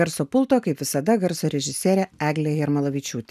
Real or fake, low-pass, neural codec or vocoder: real; 14.4 kHz; none